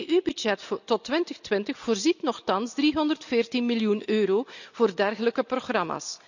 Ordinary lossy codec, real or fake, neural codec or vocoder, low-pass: none; real; none; 7.2 kHz